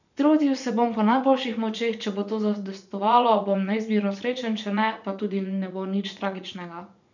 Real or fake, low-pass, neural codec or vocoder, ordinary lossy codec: fake; 7.2 kHz; vocoder, 44.1 kHz, 80 mel bands, Vocos; none